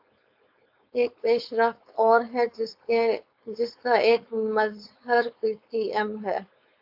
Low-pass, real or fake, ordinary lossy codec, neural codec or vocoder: 5.4 kHz; fake; AAC, 48 kbps; codec, 16 kHz, 4.8 kbps, FACodec